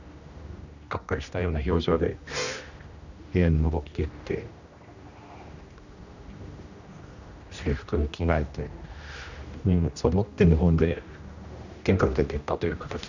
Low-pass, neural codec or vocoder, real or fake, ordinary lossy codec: 7.2 kHz; codec, 16 kHz, 1 kbps, X-Codec, HuBERT features, trained on general audio; fake; none